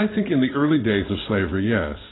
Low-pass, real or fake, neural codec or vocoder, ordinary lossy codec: 7.2 kHz; fake; codec, 16 kHz in and 24 kHz out, 1 kbps, XY-Tokenizer; AAC, 16 kbps